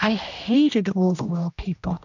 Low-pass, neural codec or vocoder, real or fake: 7.2 kHz; codec, 16 kHz, 1 kbps, X-Codec, HuBERT features, trained on general audio; fake